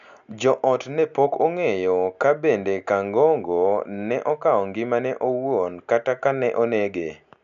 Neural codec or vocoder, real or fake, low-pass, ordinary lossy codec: none; real; 7.2 kHz; none